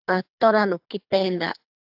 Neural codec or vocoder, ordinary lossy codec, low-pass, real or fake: codec, 24 kHz, 3 kbps, HILCodec; AAC, 48 kbps; 5.4 kHz; fake